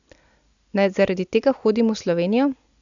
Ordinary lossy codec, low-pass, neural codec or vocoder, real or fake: none; 7.2 kHz; none; real